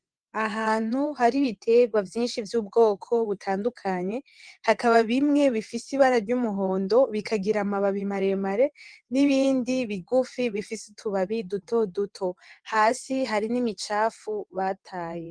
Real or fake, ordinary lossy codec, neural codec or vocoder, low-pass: fake; Opus, 24 kbps; vocoder, 22.05 kHz, 80 mel bands, WaveNeXt; 9.9 kHz